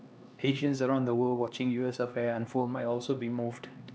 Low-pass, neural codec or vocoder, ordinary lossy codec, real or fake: none; codec, 16 kHz, 2 kbps, X-Codec, HuBERT features, trained on LibriSpeech; none; fake